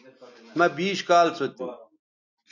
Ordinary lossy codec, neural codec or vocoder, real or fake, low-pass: AAC, 48 kbps; none; real; 7.2 kHz